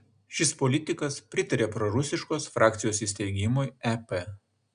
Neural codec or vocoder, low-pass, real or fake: none; 9.9 kHz; real